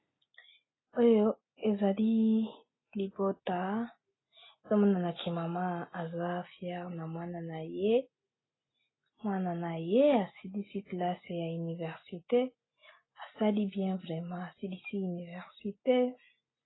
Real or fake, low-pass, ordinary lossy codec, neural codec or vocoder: real; 7.2 kHz; AAC, 16 kbps; none